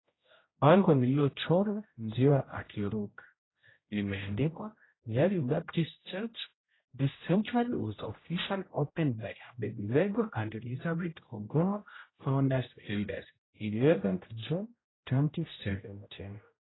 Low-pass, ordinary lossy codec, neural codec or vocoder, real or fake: 7.2 kHz; AAC, 16 kbps; codec, 16 kHz, 0.5 kbps, X-Codec, HuBERT features, trained on general audio; fake